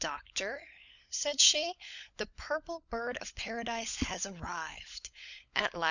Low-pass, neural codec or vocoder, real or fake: 7.2 kHz; codec, 16 kHz, 16 kbps, FunCodec, trained on LibriTTS, 50 frames a second; fake